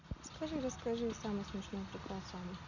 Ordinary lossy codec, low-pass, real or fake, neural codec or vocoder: none; 7.2 kHz; real; none